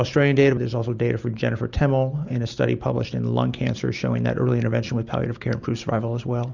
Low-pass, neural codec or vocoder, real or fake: 7.2 kHz; none; real